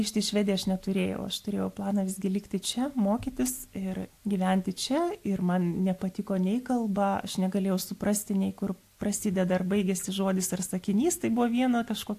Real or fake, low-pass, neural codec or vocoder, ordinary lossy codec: real; 14.4 kHz; none; AAC, 64 kbps